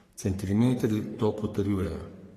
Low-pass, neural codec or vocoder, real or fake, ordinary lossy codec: 14.4 kHz; codec, 44.1 kHz, 3.4 kbps, Pupu-Codec; fake; AAC, 48 kbps